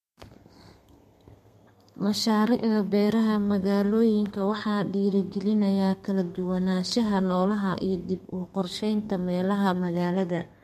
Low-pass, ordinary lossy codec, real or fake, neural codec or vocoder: 14.4 kHz; MP3, 64 kbps; fake; codec, 32 kHz, 1.9 kbps, SNAC